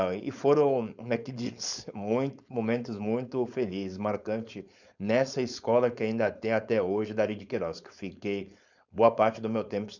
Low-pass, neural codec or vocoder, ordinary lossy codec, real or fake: 7.2 kHz; codec, 16 kHz, 4.8 kbps, FACodec; none; fake